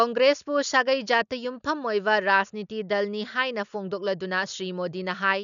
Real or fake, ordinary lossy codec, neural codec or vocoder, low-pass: real; none; none; 7.2 kHz